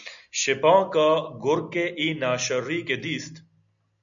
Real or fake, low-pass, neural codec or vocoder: real; 7.2 kHz; none